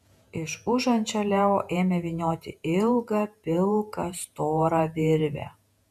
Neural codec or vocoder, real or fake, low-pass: vocoder, 48 kHz, 128 mel bands, Vocos; fake; 14.4 kHz